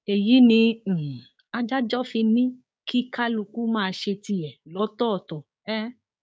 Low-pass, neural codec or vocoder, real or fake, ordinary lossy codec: none; codec, 16 kHz, 6 kbps, DAC; fake; none